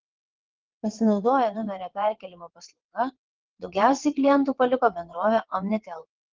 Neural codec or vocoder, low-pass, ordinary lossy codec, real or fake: vocoder, 22.05 kHz, 80 mel bands, WaveNeXt; 7.2 kHz; Opus, 16 kbps; fake